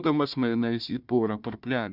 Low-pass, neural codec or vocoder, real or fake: 5.4 kHz; codec, 16 kHz, 1 kbps, FunCodec, trained on Chinese and English, 50 frames a second; fake